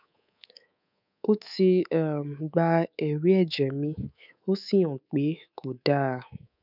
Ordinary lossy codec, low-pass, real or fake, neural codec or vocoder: none; 5.4 kHz; fake; codec, 24 kHz, 3.1 kbps, DualCodec